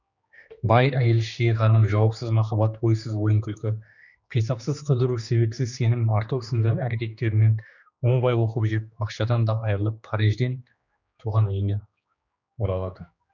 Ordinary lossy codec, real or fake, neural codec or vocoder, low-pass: none; fake; codec, 16 kHz, 2 kbps, X-Codec, HuBERT features, trained on general audio; 7.2 kHz